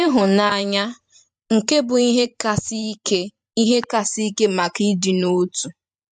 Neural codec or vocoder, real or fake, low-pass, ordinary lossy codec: vocoder, 44.1 kHz, 128 mel bands every 512 samples, BigVGAN v2; fake; 10.8 kHz; MP3, 64 kbps